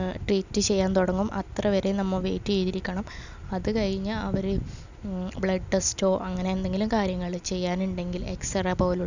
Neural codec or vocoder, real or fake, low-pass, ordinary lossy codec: none; real; 7.2 kHz; none